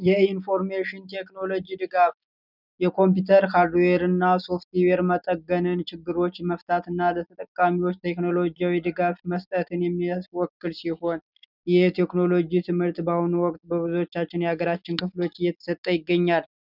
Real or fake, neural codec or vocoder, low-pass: real; none; 5.4 kHz